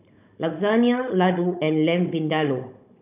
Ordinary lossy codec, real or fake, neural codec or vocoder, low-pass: none; fake; codec, 16 kHz, 16 kbps, FunCodec, trained on Chinese and English, 50 frames a second; 3.6 kHz